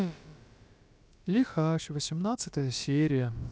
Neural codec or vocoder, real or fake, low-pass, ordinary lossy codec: codec, 16 kHz, about 1 kbps, DyCAST, with the encoder's durations; fake; none; none